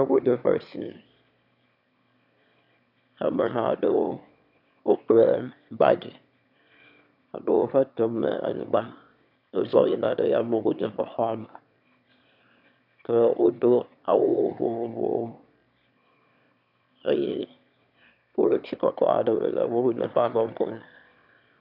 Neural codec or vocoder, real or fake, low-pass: autoencoder, 22.05 kHz, a latent of 192 numbers a frame, VITS, trained on one speaker; fake; 5.4 kHz